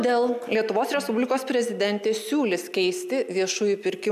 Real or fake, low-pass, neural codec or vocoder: real; 14.4 kHz; none